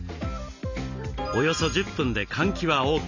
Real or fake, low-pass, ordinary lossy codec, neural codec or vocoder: real; 7.2 kHz; none; none